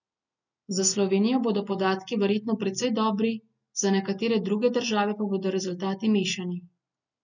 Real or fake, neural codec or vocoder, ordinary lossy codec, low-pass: real; none; none; 7.2 kHz